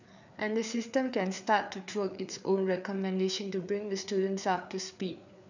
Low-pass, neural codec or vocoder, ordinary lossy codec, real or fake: 7.2 kHz; codec, 16 kHz, 4 kbps, FreqCodec, larger model; none; fake